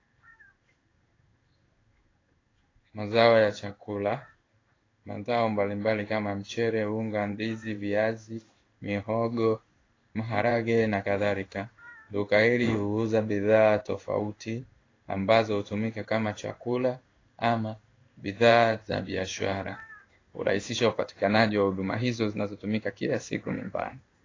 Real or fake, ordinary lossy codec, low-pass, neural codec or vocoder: fake; AAC, 32 kbps; 7.2 kHz; codec, 16 kHz in and 24 kHz out, 1 kbps, XY-Tokenizer